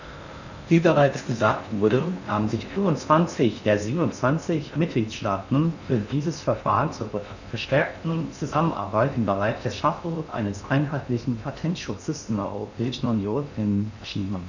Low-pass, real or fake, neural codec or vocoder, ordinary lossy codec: 7.2 kHz; fake; codec, 16 kHz in and 24 kHz out, 0.6 kbps, FocalCodec, streaming, 4096 codes; none